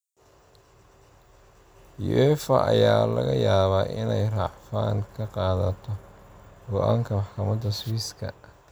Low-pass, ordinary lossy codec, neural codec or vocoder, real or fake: none; none; none; real